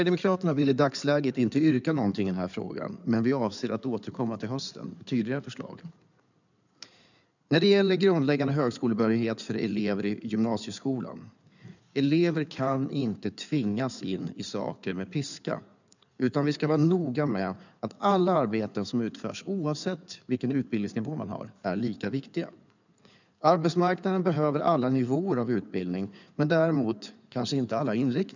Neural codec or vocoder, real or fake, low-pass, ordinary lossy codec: codec, 16 kHz in and 24 kHz out, 2.2 kbps, FireRedTTS-2 codec; fake; 7.2 kHz; none